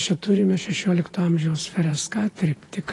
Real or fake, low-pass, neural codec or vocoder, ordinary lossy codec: fake; 10.8 kHz; vocoder, 48 kHz, 128 mel bands, Vocos; AAC, 32 kbps